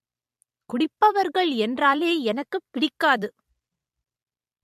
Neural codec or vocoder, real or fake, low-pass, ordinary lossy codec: vocoder, 44.1 kHz, 128 mel bands every 256 samples, BigVGAN v2; fake; 14.4 kHz; MP3, 64 kbps